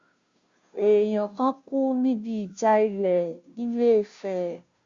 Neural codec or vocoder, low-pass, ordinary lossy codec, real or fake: codec, 16 kHz, 0.5 kbps, FunCodec, trained on Chinese and English, 25 frames a second; 7.2 kHz; Opus, 64 kbps; fake